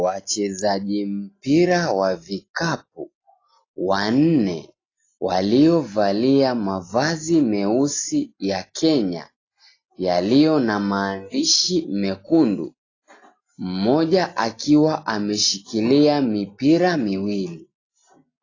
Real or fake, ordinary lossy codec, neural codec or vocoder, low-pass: real; AAC, 32 kbps; none; 7.2 kHz